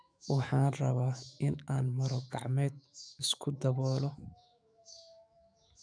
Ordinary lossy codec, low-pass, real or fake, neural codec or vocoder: none; 9.9 kHz; fake; autoencoder, 48 kHz, 128 numbers a frame, DAC-VAE, trained on Japanese speech